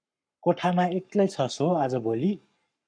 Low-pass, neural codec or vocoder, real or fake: 9.9 kHz; codec, 44.1 kHz, 7.8 kbps, Pupu-Codec; fake